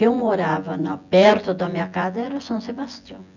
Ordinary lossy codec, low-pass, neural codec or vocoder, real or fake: none; 7.2 kHz; vocoder, 24 kHz, 100 mel bands, Vocos; fake